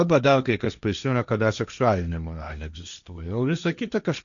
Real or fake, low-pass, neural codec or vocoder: fake; 7.2 kHz; codec, 16 kHz, 1.1 kbps, Voila-Tokenizer